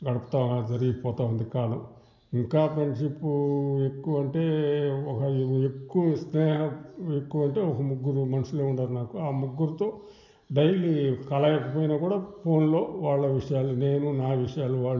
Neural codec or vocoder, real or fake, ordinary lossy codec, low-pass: none; real; none; 7.2 kHz